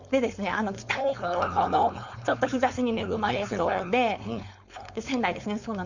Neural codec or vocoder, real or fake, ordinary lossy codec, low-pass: codec, 16 kHz, 4.8 kbps, FACodec; fake; Opus, 64 kbps; 7.2 kHz